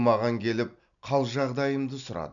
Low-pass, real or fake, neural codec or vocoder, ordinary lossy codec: 7.2 kHz; real; none; none